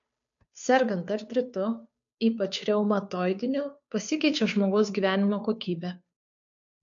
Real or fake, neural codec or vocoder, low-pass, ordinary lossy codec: fake; codec, 16 kHz, 2 kbps, FunCodec, trained on Chinese and English, 25 frames a second; 7.2 kHz; MP3, 64 kbps